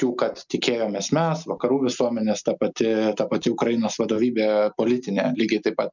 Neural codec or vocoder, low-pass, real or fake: none; 7.2 kHz; real